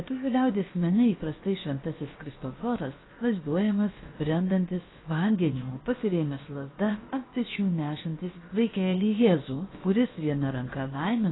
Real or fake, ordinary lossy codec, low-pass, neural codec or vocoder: fake; AAC, 16 kbps; 7.2 kHz; codec, 16 kHz, about 1 kbps, DyCAST, with the encoder's durations